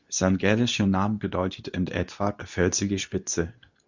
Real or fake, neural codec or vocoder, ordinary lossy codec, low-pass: fake; codec, 24 kHz, 0.9 kbps, WavTokenizer, medium speech release version 2; Opus, 64 kbps; 7.2 kHz